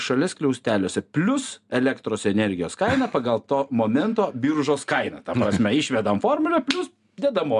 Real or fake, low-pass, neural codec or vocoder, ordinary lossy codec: real; 10.8 kHz; none; MP3, 96 kbps